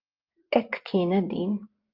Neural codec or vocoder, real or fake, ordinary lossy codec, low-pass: vocoder, 24 kHz, 100 mel bands, Vocos; fake; Opus, 24 kbps; 5.4 kHz